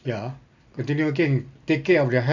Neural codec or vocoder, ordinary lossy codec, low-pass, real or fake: none; AAC, 48 kbps; 7.2 kHz; real